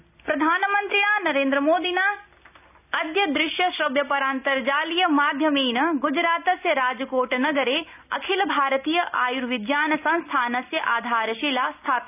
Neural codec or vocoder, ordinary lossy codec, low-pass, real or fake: none; none; 3.6 kHz; real